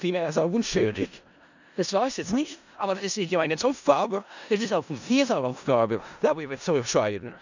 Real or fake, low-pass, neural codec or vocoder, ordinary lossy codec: fake; 7.2 kHz; codec, 16 kHz in and 24 kHz out, 0.4 kbps, LongCat-Audio-Codec, four codebook decoder; none